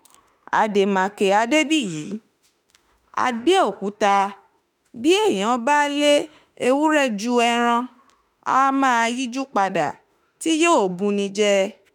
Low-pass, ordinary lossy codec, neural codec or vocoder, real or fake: none; none; autoencoder, 48 kHz, 32 numbers a frame, DAC-VAE, trained on Japanese speech; fake